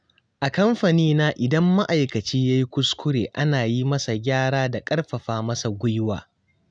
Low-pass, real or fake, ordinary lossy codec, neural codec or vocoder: 9.9 kHz; real; none; none